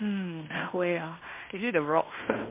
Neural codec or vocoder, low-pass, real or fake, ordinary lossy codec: codec, 16 kHz in and 24 kHz out, 0.9 kbps, LongCat-Audio-Codec, fine tuned four codebook decoder; 3.6 kHz; fake; MP3, 24 kbps